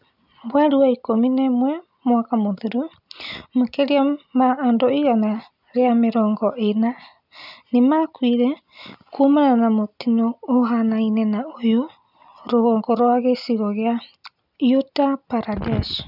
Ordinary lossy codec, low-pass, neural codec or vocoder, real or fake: none; 5.4 kHz; none; real